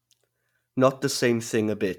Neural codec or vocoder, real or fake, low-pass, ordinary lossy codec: none; real; 19.8 kHz; none